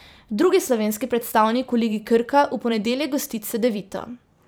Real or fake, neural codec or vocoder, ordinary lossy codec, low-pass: fake; vocoder, 44.1 kHz, 128 mel bands every 512 samples, BigVGAN v2; none; none